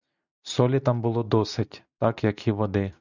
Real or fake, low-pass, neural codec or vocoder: real; 7.2 kHz; none